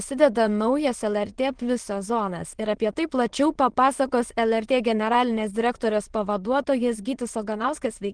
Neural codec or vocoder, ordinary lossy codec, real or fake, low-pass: autoencoder, 22.05 kHz, a latent of 192 numbers a frame, VITS, trained on many speakers; Opus, 16 kbps; fake; 9.9 kHz